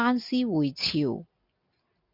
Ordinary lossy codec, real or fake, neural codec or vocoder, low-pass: MP3, 48 kbps; real; none; 5.4 kHz